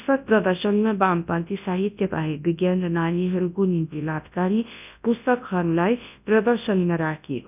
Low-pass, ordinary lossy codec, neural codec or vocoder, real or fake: 3.6 kHz; none; codec, 24 kHz, 0.9 kbps, WavTokenizer, large speech release; fake